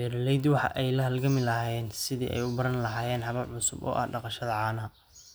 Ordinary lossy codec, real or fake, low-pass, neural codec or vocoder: none; real; none; none